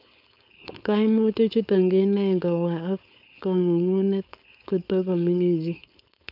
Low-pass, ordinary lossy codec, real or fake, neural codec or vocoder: 5.4 kHz; none; fake; codec, 16 kHz, 4.8 kbps, FACodec